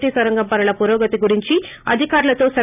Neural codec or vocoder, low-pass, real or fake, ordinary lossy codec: none; 3.6 kHz; real; none